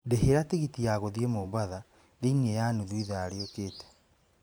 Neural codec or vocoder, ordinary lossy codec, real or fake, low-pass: vocoder, 44.1 kHz, 128 mel bands every 512 samples, BigVGAN v2; none; fake; none